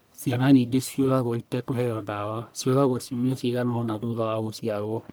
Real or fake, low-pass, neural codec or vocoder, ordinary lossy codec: fake; none; codec, 44.1 kHz, 1.7 kbps, Pupu-Codec; none